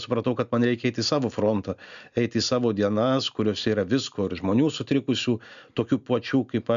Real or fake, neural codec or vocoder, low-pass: real; none; 7.2 kHz